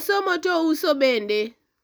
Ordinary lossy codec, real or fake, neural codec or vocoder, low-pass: none; real; none; none